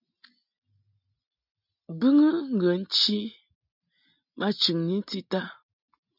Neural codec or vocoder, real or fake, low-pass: none; real; 5.4 kHz